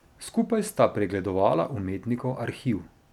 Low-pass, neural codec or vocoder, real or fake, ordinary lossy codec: 19.8 kHz; none; real; none